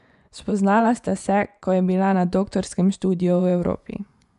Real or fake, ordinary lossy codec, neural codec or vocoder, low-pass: fake; none; vocoder, 24 kHz, 100 mel bands, Vocos; 10.8 kHz